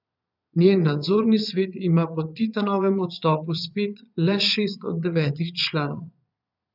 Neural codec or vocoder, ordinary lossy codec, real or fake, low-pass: vocoder, 22.05 kHz, 80 mel bands, Vocos; none; fake; 5.4 kHz